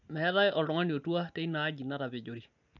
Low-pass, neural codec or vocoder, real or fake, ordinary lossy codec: 7.2 kHz; none; real; none